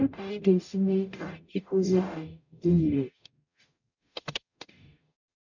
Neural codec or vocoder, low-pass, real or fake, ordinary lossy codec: codec, 44.1 kHz, 0.9 kbps, DAC; 7.2 kHz; fake; none